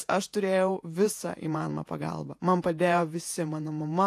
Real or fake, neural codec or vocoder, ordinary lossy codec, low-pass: fake; vocoder, 48 kHz, 128 mel bands, Vocos; AAC, 64 kbps; 14.4 kHz